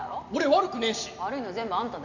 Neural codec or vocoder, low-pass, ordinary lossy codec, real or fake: none; 7.2 kHz; none; real